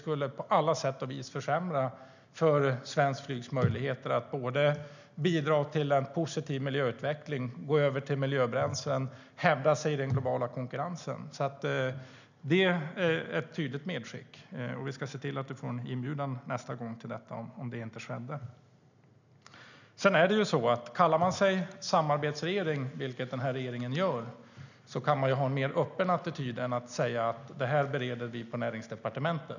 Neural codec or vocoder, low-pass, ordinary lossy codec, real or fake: none; 7.2 kHz; none; real